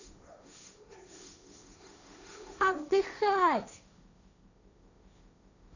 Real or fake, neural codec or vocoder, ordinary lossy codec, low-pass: fake; codec, 16 kHz, 1.1 kbps, Voila-Tokenizer; none; 7.2 kHz